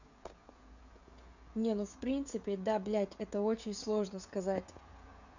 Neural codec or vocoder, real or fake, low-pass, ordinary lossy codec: codec, 16 kHz, 16 kbps, FreqCodec, smaller model; fake; 7.2 kHz; none